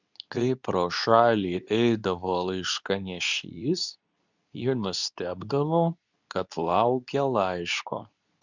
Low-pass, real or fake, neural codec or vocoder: 7.2 kHz; fake; codec, 24 kHz, 0.9 kbps, WavTokenizer, medium speech release version 2